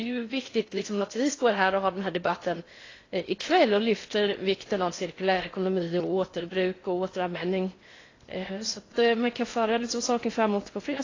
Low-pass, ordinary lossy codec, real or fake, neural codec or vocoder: 7.2 kHz; AAC, 32 kbps; fake; codec, 16 kHz in and 24 kHz out, 0.6 kbps, FocalCodec, streaming, 4096 codes